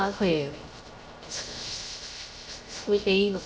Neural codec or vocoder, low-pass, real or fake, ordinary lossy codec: codec, 16 kHz, 0.3 kbps, FocalCodec; none; fake; none